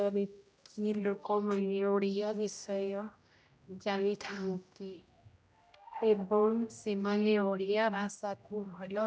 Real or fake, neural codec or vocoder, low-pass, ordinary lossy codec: fake; codec, 16 kHz, 0.5 kbps, X-Codec, HuBERT features, trained on general audio; none; none